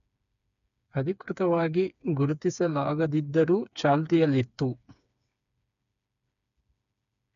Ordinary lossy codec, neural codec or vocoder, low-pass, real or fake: none; codec, 16 kHz, 4 kbps, FreqCodec, smaller model; 7.2 kHz; fake